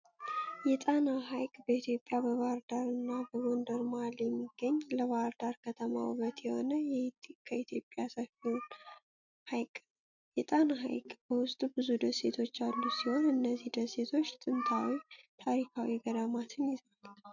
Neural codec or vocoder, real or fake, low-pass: none; real; 7.2 kHz